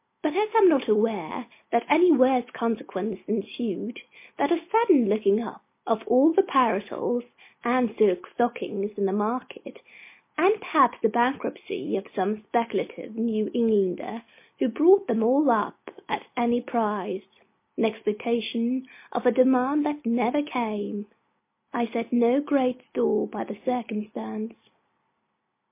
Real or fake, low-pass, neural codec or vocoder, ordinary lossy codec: real; 3.6 kHz; none; MP3, 24 kbps